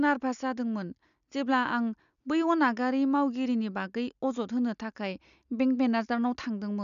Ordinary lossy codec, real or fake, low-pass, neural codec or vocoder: none; real; 7.2 kHz; none